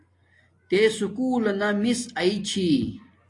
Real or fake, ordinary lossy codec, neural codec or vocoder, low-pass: real; AAC, 48 kbps; none; 10.8 kHz